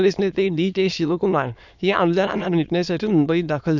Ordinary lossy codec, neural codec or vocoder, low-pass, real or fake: none; autoencoder, 22.05 kHz, a latent of 192 numbers a frame, VITS, trained on many speakers; 7.2 kHz; fake